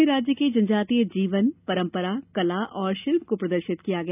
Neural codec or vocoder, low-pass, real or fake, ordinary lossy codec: none; 3.6 kHz; real; none